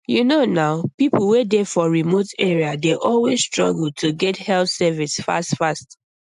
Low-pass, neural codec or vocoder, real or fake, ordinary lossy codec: 10.8 kHz; none; real; AAC, 96 kbps